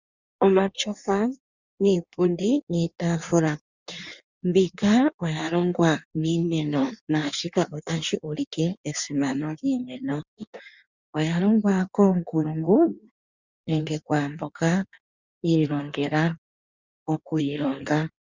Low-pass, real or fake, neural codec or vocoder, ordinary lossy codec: 7.2 kHz; fake; codec, 16 kHz in and 24 kHz out, 1.1 kbps, FireRedTTS-2 codec; Opus, 64 kbps